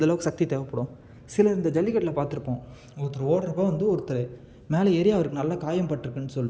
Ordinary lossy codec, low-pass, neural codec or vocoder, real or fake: none; none; none; real